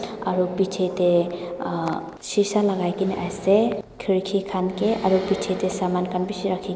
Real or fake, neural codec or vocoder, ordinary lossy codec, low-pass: real; none; none; none